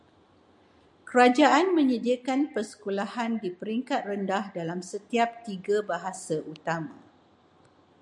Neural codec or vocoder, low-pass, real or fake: none; 10.8 kHz; real